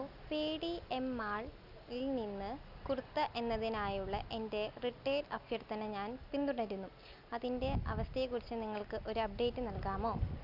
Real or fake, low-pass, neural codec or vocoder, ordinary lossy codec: real; 5.4 kHz; none; none